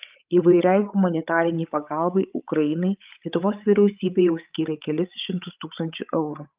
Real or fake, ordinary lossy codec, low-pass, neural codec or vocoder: fake; Opus, 32 kbps; 3.6 kHz; codec, 16 kHz, 16 kbps, FreqCodec, larger model